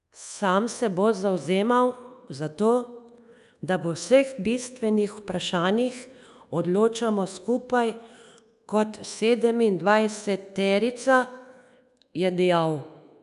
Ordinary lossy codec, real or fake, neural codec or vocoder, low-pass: none; fake; codec, 24 kHz, 1.2 kbps, DualCodec; 10.8 kHz